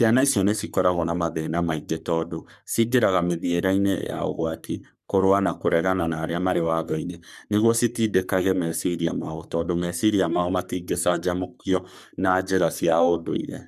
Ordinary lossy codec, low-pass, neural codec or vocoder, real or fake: none; 14.4 kHz; codec, 44.1 kHz, 3.4 kbps, Pupu-Codec; fake